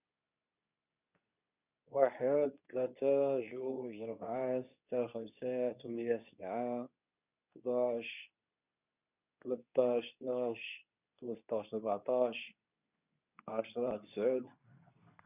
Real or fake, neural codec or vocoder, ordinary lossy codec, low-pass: fake; codec, 24 kHz, 0.9 kbps, WavTokenizer, medium speech release version 2; none; 3.6 kHz